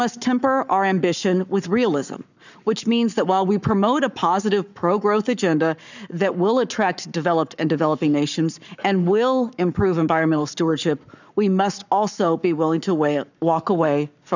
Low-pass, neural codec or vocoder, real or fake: 7.2 kHz; codec, 44.1 kHz, 7.8 kbps, Pupu-Codec; fake